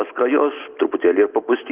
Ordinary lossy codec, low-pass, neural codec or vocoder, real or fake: Opus, 32 kbps; 3.6 kHz; none; real